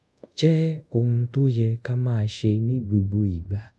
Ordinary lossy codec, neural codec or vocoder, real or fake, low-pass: none; codec, 24 kHz, 0.5 kbps, DualCodec; fake; none